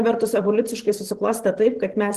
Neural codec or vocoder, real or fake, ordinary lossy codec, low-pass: vocoder, 44.1 kHz, 128 mel bands every 512 samples, BigVGAN v2; fake; Opus, 16 kbps; 14.4 kHz